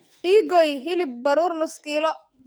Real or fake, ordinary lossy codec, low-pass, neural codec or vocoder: fake; none; none; codec, 44.1 kHz, 2.6 kbps, SNAC